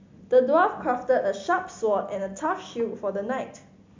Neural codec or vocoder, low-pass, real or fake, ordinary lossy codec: none; 7.2 kHz; real; MP3, 64 kbps